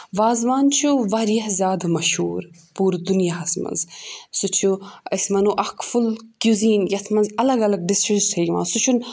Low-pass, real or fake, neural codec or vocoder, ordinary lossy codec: none; real; none; none